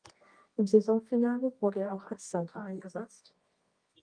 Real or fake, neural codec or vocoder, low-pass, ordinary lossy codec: fake; codec, 24 kHz, 0.9 kbps, WavTokenizer, medium music audio release; 9.9 kHz; Opus, 32 kbps